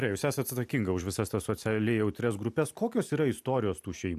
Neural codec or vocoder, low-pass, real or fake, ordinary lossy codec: none; 14.4 kHz; real; AAC, 96 kbps